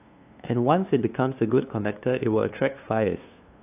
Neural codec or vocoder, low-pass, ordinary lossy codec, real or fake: codec, 16 kHz, 2 kbps, FunCodec, trained on LibriTTS, 25 frames a second; 3.6 kHz; AAC, 32 kbps; fake